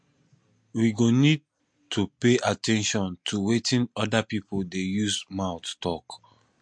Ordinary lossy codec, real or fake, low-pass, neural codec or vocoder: MP3, 48 kbps; real; 9.9 kHz; none